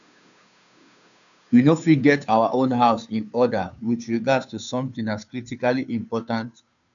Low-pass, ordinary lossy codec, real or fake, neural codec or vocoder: 7.2 kHz; none; fake; codec, 16 kHz, 2 kbps, FunCodec, trained on Chinese and English, 25 frames a second